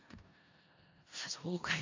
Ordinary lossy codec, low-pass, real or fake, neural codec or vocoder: MP3, 64 kbps; 7.2 kHz; fake; codec, 16 kHz in and 24 kHz out, 0.4 kbps, LongCat-Audio-Codec, four codebook decoder